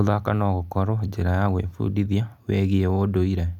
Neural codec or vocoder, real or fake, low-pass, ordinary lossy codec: none; real; 19.8 kHz; none